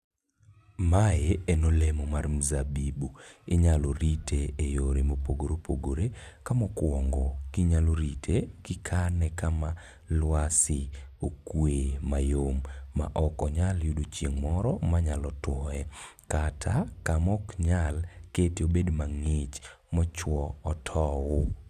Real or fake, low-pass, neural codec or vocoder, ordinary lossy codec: real; 14.4 kHz; none; none